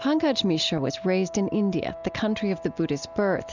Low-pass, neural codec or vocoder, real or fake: 7.2 kHz; none; real